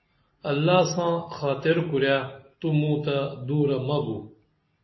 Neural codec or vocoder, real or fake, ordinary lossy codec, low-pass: none; real; MP3, 24 kbps; 7.2 kHz